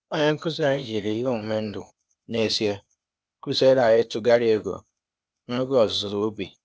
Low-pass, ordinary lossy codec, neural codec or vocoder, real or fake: none; none; codec, 16 kHz, 0.8 kbps, ZipCodec; fake